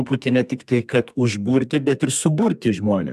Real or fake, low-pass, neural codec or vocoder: fake; 14.4 kHz; codec, 32 kHz, 1.9 kbps, SNAC